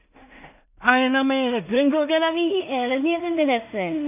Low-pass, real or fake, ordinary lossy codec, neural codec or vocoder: 3.6 kHz; fake; none; codec, 16 kHz in and 24 kHz out, 0.4 kbps, LongCat-Audio-Codec, two codebook decoder